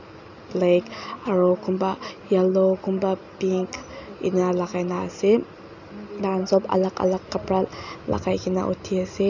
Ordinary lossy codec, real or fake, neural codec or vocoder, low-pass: none; real; none; 7.2 kHz